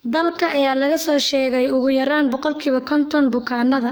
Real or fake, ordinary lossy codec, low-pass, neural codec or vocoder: fake; none; none; codec, 44.1 kHz, 2.6 kbps, SNAC